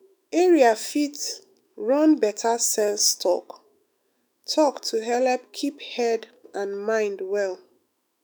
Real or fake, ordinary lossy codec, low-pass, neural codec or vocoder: fake; none; none; autoencoder, 48 kHz, 128 numbers a frame, DAC-VAE, trained on Japanese speech